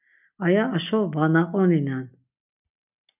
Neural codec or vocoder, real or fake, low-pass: none; real; 3.6 kHz